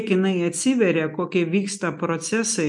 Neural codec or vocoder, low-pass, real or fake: none; 10.8 kHz; real